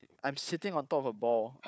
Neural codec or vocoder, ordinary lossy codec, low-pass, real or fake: codec, 16 kHz, 4 kbps, FunCodec, trained on Chinese and English, 50 frames a second; none; none; fake